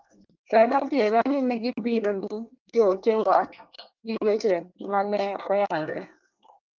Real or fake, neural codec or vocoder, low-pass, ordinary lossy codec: fake; codec, 24 kHz, 1 kbps, SNAC; 7.2 kHz; Opus, 24 kbps